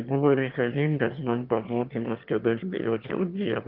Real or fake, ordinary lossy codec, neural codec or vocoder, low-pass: fake; Opus, 24 kbps; autoencoder, 22.05 kHz, a latent of 192 numbers a frame, VITS, trained on one speaker; 5.4 kHz